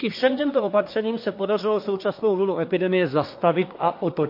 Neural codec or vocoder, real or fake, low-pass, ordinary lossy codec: codec, 24 kHz, 1 kbps, SNAC; fake; 5.4 kHz; MP3, 32 kbps